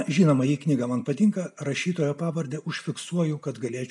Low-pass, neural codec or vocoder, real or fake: 10.8 kHz; none; real